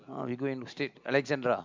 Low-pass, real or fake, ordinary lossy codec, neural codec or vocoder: 7.2 kHz; real; MP3, 64 kbps; none